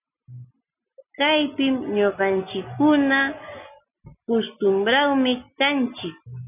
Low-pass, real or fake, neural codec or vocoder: 3.6 kHz; real; none